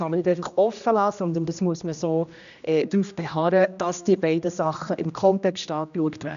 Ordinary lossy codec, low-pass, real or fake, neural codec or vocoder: none; 7.2 kHz; fake; codec, 16 kHz, 1 kbps, X-Codec, HuBERT features, trained on general audio